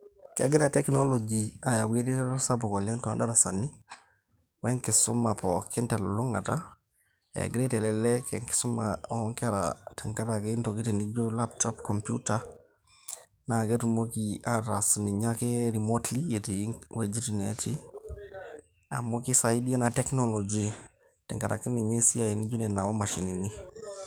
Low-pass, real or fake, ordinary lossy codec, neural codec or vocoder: none; fake; none; codec, 44.1 kHz, 7.8 kbps, DAC